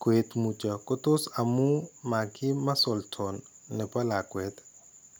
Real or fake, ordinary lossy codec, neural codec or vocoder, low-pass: real; none; none; none